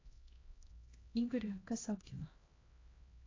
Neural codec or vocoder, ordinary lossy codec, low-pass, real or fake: codec, 16 kHz, 1 kbps, X-Codec, HuBERT features, trained on LibriSpeech; AAC, 32 kbps; 7.2 kHz; fake